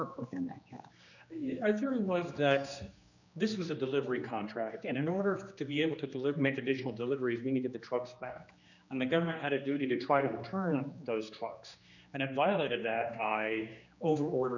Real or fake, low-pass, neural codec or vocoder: fake; 7.2 kHz; codec, 16 kHz, 2 kbps, X-Codec, HuBERT features, trained on general audio